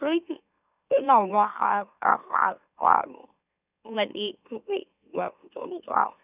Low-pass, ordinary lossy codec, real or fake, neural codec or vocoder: 3.6 kHz; none; fake; autoencoder, 44.1 kHz, a latent of 192 numbers a frame, MeloTTS